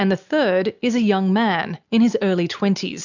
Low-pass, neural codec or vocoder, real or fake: 7.2 kHz; none; real